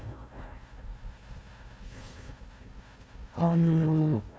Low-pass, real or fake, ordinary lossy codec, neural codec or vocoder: none; fake; none; codec, 16 kHz, 1 kbps, FunCodec, trained on Chinese and English, 50 frames a second